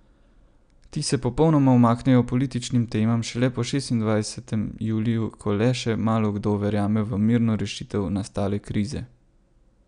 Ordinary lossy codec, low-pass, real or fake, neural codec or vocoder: none; 9.9 kHz; real; none